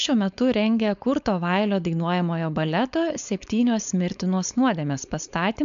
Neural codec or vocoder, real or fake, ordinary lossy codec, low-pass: codec, 16 kHz, 4.8 kbps, FACodec; fake; AAC, 96 kbps; 7.2 kHz